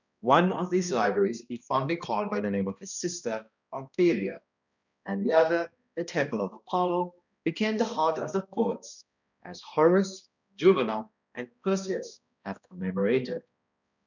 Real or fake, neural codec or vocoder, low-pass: fake; codec, 16 kHz, 1 kbps, X-Codec, HuBERT features, trained on balanced general audio; 7.2 kHz